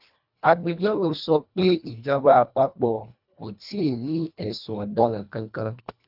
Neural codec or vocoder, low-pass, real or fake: codec, 24 kHz, 1.5 kbps, HILCodec; 5.4 kHz; fake